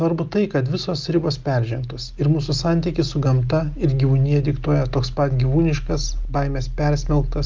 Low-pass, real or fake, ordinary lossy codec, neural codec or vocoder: 7.2 kHz; real; Opus, 32 kbps; none